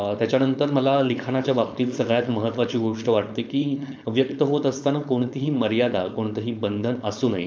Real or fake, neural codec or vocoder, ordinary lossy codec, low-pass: fake; codec, 16 kHz, 4.8 kbps, FACodec; none; none